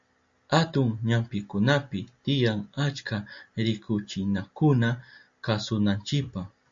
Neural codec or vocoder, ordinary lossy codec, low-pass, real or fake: none; MP3, 48 kbps; 7.2 kHz; real